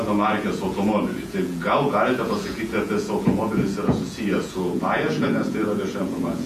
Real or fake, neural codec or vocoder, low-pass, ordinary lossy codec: fake; vocoder, 48 kHz, 128 mel bands, Vocos; 14.4 kHz; AAC, 96 kbps